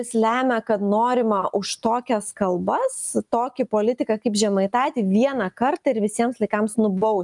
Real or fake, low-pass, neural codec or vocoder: real; 10.8 kHz; none